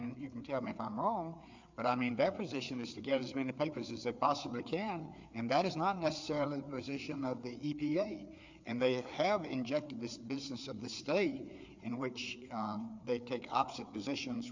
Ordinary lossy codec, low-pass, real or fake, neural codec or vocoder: MP3, 64 kbps; 7.2 kHz; fake; codec, 16 kHz, 4 kbps, FreqCodec, larger model